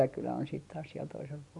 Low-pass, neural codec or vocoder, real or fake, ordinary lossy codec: 10.8 kHz; none; real; none